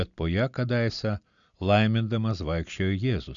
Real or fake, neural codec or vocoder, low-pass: real; none; 7.2 kHz